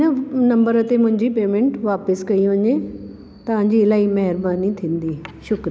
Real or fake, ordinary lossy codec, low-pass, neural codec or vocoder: real; none; none; none